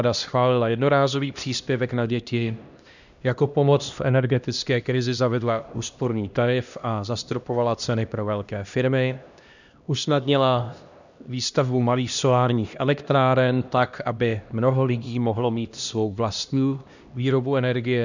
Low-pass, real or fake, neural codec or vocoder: 7.2 kHz; fake; codec, 16 kHz, 1 kbps, X-Codec, HuBERT features, trained on LibriSpeech